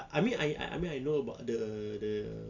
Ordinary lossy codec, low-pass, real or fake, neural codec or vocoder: none; 7.2 kHz; real; none